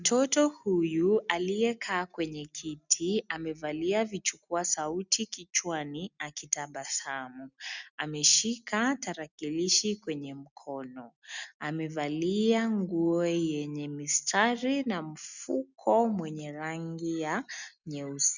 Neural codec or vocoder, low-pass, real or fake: none; 7.2 kHz; real